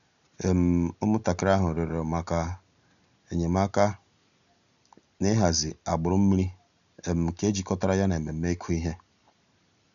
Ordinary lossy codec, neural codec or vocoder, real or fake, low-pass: none; none; real; 7.2 kHz